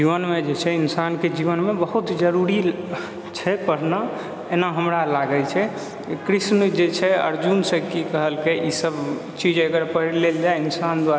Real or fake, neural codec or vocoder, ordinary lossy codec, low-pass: real; none; none; none